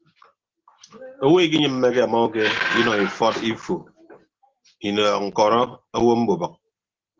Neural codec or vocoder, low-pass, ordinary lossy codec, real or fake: none; 7.2 kHz; Opus, 16 kbps; real